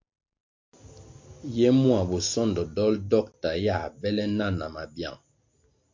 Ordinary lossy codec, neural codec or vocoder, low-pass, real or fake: MP3, 48 kbps; none; 7.2 kHz; real